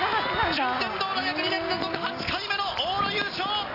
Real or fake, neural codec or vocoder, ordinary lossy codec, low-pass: real; none; AAC, 24 kbps; 5.4 kHz